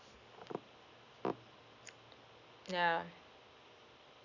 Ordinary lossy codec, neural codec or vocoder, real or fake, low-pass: none; none; real; 7.2 kHz